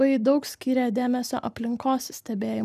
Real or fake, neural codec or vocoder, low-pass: real; none; 14.4 kHz